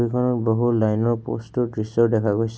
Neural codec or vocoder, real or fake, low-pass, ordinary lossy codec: none; real; none; none